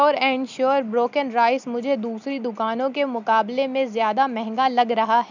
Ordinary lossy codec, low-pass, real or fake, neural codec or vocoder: none; 7.2 kHz; real; none